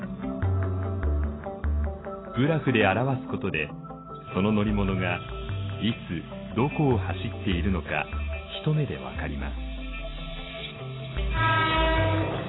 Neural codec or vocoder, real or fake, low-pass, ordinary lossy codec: none; real; 7.2 kHz; AAC, 16 kbps